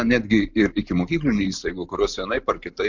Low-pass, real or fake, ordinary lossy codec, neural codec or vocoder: 7.2 kHz; real; MP3, 64 kbps; none